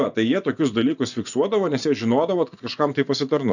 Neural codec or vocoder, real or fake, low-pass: vocoder, 24 kHz, 100 mel bands, Vocos; fake; 7.2 kHz